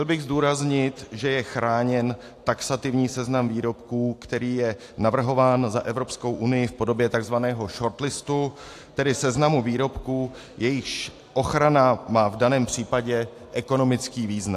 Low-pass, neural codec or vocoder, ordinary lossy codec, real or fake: 14.4 kHz; none; AAC, 64 kbps; real